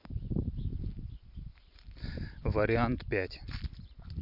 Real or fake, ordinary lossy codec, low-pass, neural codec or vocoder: real; none; 5.4 kHz; none